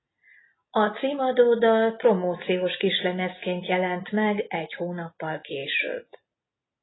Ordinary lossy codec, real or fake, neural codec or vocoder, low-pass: AAC, 16 kbps; real; none; 7.2 kHz